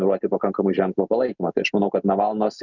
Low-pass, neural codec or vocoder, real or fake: 7.2 kHz; none; real